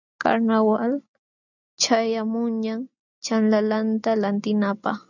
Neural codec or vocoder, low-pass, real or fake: none; 7.2 kHz; real